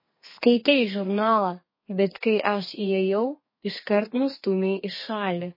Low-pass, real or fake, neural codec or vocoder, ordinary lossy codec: 5.4 kHz; fake; codec, 32 kHz, 1.9 kbps, SNAC; MP3, 24 kbps